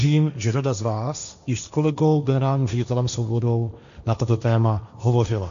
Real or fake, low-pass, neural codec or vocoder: fake; 7.2 kHz; codec, 16 kHz, 1.1 kbps, Voila-Tokenizer